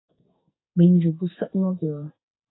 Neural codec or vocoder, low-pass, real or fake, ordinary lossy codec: codec, 44.1 kHz, 2.6 kbps, SNAC; 7.2 kHz; fake; AAC, 16 kbps